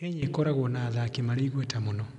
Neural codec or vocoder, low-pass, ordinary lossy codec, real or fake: none; 10.8 kHz; none; real